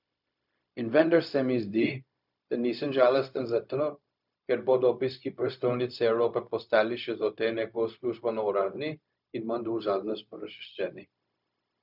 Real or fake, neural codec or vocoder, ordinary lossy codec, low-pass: fake; codec, 16 kHz, 0.4 kbps, LongCat-Audio-Codec; none; 5.4 kHz